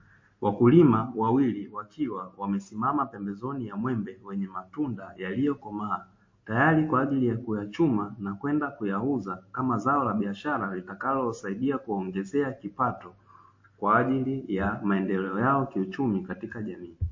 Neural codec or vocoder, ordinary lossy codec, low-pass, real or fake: none; MP3, 32 kbps; 7.2 kHz; real